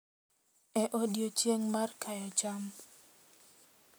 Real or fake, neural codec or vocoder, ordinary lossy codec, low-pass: real; none; none; none